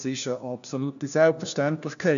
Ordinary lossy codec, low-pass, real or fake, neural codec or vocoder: AAC, 96 kbps; 7.2 kHz; fake; codec, 16 kHz, 1 kbps, FunCodec, trained on LibriTTS, 50 frames a second